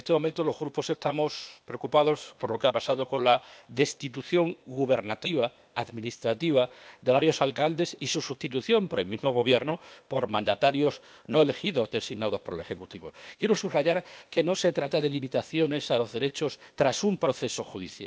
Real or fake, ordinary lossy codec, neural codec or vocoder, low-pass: fake; none; codec, 16 kHz, 0.8 kbps, ZipCodec; none